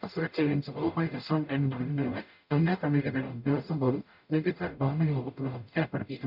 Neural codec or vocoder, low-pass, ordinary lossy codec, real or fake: codec, 44.1 kHz, 0.9 kbps, DAC; 5.4 kHz; none; fake